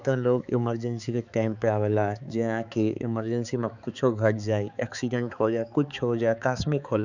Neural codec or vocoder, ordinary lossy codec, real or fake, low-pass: codec, 16 kHz, 4 kbps, X-Codec, HuBERT features, trained on balanced general audio; none; fake; 7.2 kHz